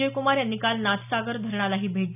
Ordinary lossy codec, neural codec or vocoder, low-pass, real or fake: none; none; 3.6 kHz; real